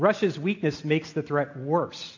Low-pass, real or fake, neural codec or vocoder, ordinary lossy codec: 7.2 kHz; real; none; AAC, 48 kbps